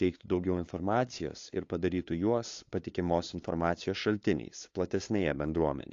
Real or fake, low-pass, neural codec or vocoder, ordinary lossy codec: fake; 7.2 kHz; codec, 16 kHz, 2 kbps, FunCodec, trained on LibriTTS, 25 frames a second; AAC, 48 kbps